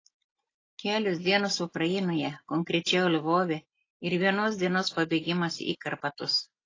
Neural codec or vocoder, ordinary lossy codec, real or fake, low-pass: none; AAC, 32 kbps; real; 7.2 kHz